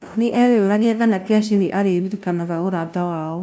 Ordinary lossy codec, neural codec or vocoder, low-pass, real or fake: none; codec, 16 kHz, 0.5 kbps, FunCodec, trained on LibriTTS, 25 frames a second; none; fake